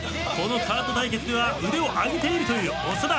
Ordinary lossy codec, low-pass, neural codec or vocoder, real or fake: none; none; none; real